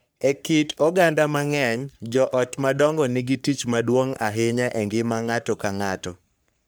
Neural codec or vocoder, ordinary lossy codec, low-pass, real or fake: codec, 44.1 kHz, 3.4 kbps, Pupu-Codec; none; none; fake